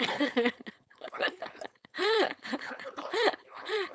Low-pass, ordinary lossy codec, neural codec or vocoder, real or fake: none; none; codec, 16 kHz, 4.8 kbps, FACodec; fake